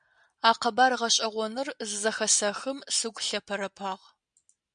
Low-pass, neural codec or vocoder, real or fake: 9.9 kHz; none; real